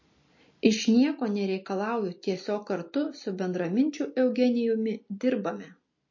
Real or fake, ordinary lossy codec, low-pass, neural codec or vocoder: real; MP3, 32 kbps; 7.2 kHz; none